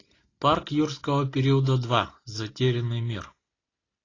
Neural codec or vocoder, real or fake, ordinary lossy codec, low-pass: none; real; AAC, 32 kbps; 7.2 kHz